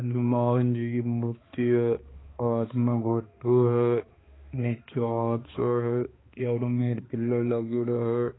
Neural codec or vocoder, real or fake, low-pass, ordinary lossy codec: codec, 16 kHz, 2 kbps, X-Codec, HuBERT features, trained on balanced general audio; fake; 7.2 kHz; AAC, 16 kbps